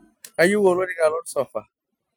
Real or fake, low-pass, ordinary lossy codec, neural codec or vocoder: real; none; none; none